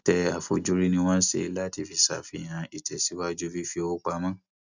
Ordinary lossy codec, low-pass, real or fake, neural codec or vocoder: none; 7.2 kHz; real; none